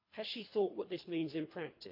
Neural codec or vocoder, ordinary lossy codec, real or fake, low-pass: codec, 24 kHz, 3 kbps, HILCodec; MP3, 32 kbps; fake; 5.4 kHz